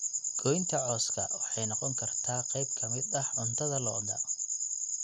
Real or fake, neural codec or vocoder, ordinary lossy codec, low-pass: real; none; none; none